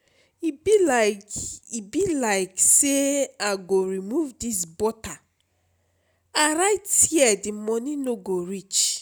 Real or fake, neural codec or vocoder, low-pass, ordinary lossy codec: real; none; none; none